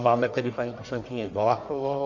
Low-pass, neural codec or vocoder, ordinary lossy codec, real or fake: 7.2 kHz; codec, 44.1 kHz, 1.7 kbps, Pupu-Codec; MP3, 64 kbps; fake